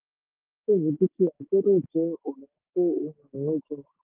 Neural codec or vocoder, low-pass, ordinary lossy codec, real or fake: codec, 16 kHz, 6 kbps, DAC; 3.6 kHz; Opus, 32 kbps; fake